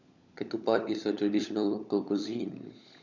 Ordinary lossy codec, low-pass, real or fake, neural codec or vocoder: none; 7.2 kHz; fake; codec, 16 kHz, 16 kbps, FunCodec, trained on LibriTTS, 50 frames a second